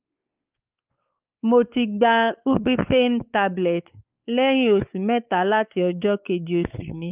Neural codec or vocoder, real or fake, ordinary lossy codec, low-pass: codec, 16 kHz, 4 kbps, X-Codec, WavLM features, trained on Multilingual LibriSpeech; fake; Opus, 24 kbps; 3.6 kHz